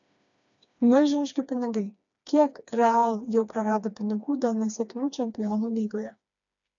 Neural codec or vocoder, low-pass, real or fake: codec, 16 kHz, 2 kbps, FreqCodec, smaller model; 7.2 kHz; fake